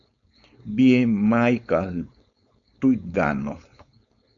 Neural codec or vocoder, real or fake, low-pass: codec, 16 kHz, 4.8 kbps, FACodec; fake; 7.2 kHz